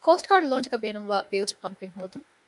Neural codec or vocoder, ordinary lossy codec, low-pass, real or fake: autoencoder, 48 kHz, 32 numbers a frame, DAC-VAE, trained on Japanese speech; MP3, 96 kbps; 10.8 kHz; fake